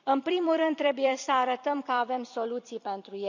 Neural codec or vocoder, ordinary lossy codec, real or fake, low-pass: vocoder, 44.1 kHz, 128 mel bands every 256 samples, BigVGAN v2; none; fake; 7.2 kHz